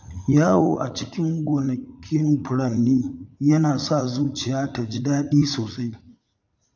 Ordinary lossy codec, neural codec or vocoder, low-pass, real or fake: AAC, 48 kbps; vocoder, 44.1 kHz, 80 mel bands, Vocos; 7.2 kHz; fake